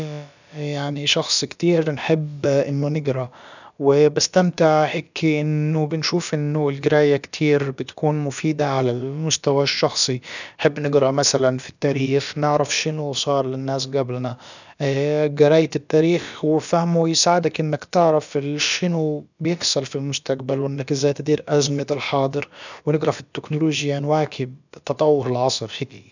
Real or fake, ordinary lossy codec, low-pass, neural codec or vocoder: fake; none; 7.2 kHz; codec, 16 kHz, about 1 kbps, DyCAST, with the encoder's durations